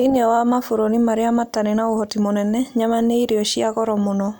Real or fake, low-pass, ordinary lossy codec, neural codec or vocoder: real; none; none; none